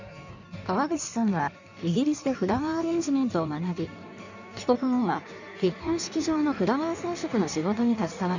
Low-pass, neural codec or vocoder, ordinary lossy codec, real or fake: 7.2 kHz; codec, 16 kHz in and 24 kHz out, 1.1 kbps, FireRedTTS-2 codec; none; fake